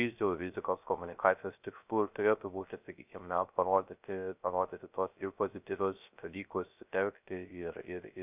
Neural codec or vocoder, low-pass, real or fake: codec, 16 kHz, 0.3 kbps, FocalCodec; 3.6 kHz; fake